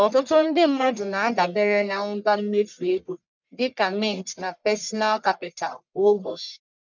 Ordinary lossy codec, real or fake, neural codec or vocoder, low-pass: none; fake; codec, 44.1 kHz, 1.7 kbps, Pupu-Codec; 7.2 kHz